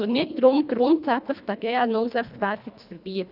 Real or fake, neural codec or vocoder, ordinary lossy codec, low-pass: fake; codec, 24 kHz, 1.5 kbps, HILCodec; none; 5.4 kHz